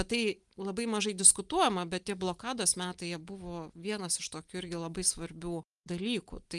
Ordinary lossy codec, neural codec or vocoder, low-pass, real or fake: Opus, 32 kbps; none; 10.8 kHz; real